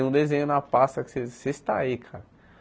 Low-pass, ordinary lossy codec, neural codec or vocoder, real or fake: none; none; none; real